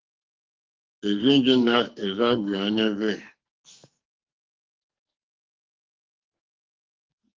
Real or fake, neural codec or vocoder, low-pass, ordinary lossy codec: fake; codec, 44.1 kHz, 2.6 kbps, SNAC; 7.2 kHz; Opus, 32 kbps